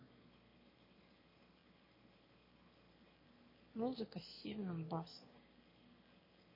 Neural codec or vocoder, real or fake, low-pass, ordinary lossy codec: autoencoder, 22.05 kHz, a latent of 192 numbers a frame, VITS, trained on one speaker; fake; 5.4 kHz; MP3, 24 kbps